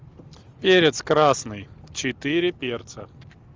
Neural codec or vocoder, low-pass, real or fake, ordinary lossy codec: none; 7.2 kHz; real; Opus, 32 kbps